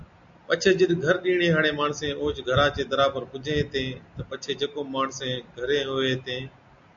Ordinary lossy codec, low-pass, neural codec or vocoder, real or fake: AAC, 64 kbps; 7.2 kHz; none; real